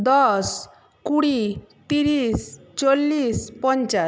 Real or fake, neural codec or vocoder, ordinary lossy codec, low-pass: real; none; none; none